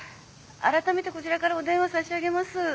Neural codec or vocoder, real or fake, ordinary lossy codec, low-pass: none; real; none; none